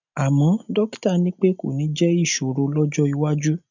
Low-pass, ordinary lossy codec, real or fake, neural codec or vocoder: 7.2 kHz; none; real; none